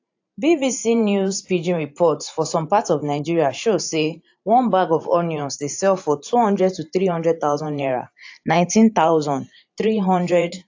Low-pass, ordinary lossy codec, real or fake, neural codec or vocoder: 7.2 kHz; AAC, 48 kbps; fake; vocoder, 44.1 kHz, 128 mel bands every 512 samples, BigVGAN v2